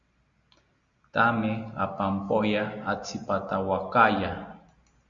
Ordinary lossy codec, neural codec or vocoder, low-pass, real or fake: Opus, 64 kbps; none; 7.2 kHz; real